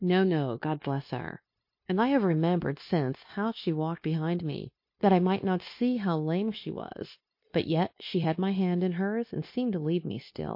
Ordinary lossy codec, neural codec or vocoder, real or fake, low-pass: MP3, 32 kbps; codec, 16 kHz, 0.9 kbps, LongCat-Audio-Codec; fake; 5.4 kHz